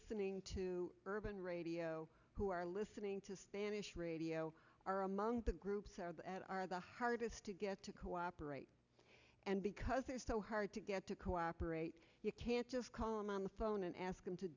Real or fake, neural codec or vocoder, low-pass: real; none; 7.2 kHz